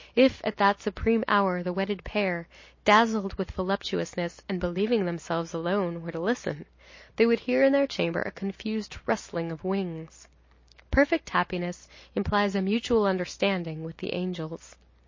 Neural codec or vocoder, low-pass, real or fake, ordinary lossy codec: none; 7.2 kHz; real; MP3, 32 kbps